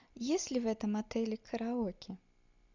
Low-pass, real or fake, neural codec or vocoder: 7.2 kHz; real; none